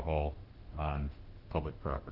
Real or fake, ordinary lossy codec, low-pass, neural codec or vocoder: fake; Opus, 16 kbps; 5.4 kHz; autoencoder, 48 kHz, 32 numbers a frame, DAC-VAE, trained on Japanese speech